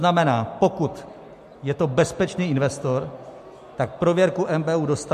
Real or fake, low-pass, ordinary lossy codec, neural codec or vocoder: real; 14.4 kHz; MP3, 64 kbps; none